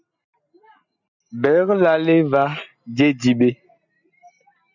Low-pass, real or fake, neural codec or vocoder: 7.2 kHz; real; none